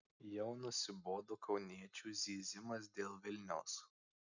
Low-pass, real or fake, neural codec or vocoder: 7.2 kHz; real; none